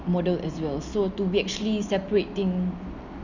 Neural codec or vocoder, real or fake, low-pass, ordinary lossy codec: none; real; 7.2 kHz; none